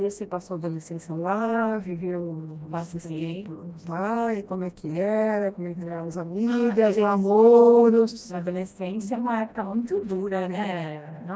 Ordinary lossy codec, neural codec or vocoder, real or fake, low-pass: none; codec, 16 kHz, 1 kbps, FreqCodec, smaller model; fake; none